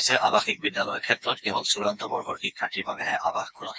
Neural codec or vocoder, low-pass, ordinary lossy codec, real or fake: codec, 16 kHz, 2 kbps, FreqCodec, smaller model; none; none; fake